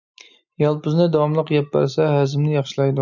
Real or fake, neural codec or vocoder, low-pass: real; none; 7.2 kHz